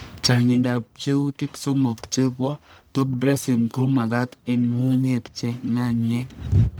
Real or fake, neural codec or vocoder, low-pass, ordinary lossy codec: fake; codec, 44.1 kHz, 1.7 kbps, Pupu-Codec; none; none